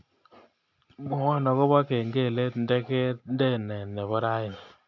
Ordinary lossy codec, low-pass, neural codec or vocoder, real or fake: none; 7.2 kHz; none; real